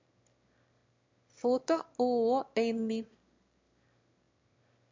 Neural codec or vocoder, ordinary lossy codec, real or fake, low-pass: autoencoder, 22.05 kHz, a latent of 192 numbers a frame, VITS, trained on one speaker; MP3, 64 kbps; fake; 7.2 kHz